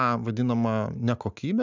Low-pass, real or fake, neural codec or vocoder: 7.2 kHz; real; none